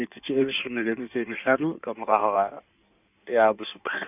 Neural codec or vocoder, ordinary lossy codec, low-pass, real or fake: codec, 16 kHz in and 24 kHz out, 1.1 kbps, FireRedTTS-2 codec; none; 3.6 kHz; fake